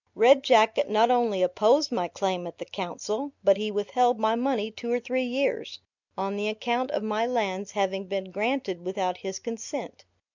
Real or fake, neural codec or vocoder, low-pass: real; none; 7.2 kHz